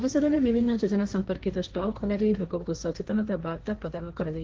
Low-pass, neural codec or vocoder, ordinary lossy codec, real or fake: 7.2 kHz; codec, 16 kHz, 1.1 kbps, Voila-Tokenizer; Opus, 16 kbps; fake